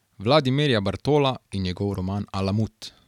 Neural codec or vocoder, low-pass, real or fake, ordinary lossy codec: none; 19.8 kHz; real; none